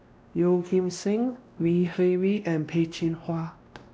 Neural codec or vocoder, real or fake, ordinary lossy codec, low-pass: codec, 16 kHz, 1 kbps, X-Codec, WavLM features, trained on Multilingual LibriSpeech; fake; none; none